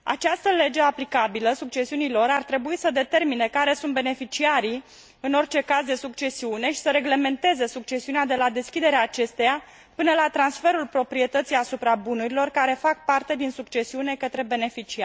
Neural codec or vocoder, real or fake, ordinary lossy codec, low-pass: none; real; none; none